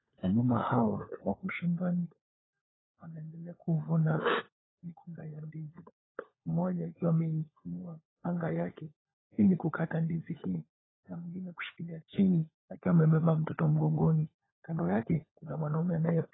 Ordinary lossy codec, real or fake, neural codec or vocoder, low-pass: AAC, 16 kbps; fake; codec, 16 kHz, 4 kbps, FunCodec, trained on LibriTTS, 50 frames a second; 7.2 kHz